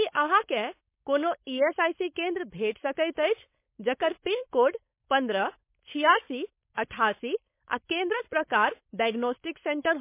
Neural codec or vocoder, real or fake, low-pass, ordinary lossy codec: codec, 16 kHz, 4.8 kbps, FACodec; fake; 3.6 kHz; MP3, 24 kbps